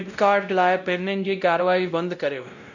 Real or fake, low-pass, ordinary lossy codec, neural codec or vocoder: fake; 7.2 kHz; none; codec, 16 kHz, 0.5 kbps, X-Codec, WavLM features, trained on Multilingual LibriSpeech